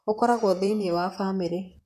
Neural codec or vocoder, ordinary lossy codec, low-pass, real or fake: vocoder, 44.1 kHz, 128 mel bands, Pupu-Vocoder; none; 14.4 kHz; fake